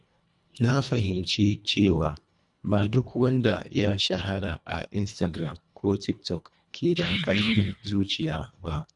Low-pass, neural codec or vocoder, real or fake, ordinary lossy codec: 10.8 kHz; codec, 24 kHz, 1.5 kbps, HILCodec; fake; none